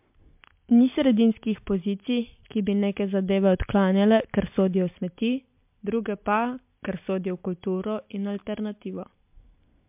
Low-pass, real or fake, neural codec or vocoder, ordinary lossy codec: 3.6 kHz; real; none; MP3, 32 kbps